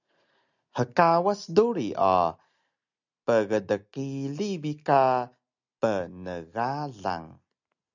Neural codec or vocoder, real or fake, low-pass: none; real; 7.2 kHz